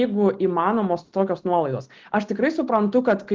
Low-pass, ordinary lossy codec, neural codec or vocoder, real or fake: 7.2 kHz; Opus, 16 kbps; none; real